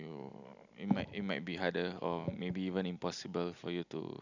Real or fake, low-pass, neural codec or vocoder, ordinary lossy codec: real; 7.2 kHz; none; none